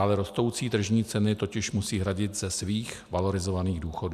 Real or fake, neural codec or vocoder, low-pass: real; none; 14.4 kHz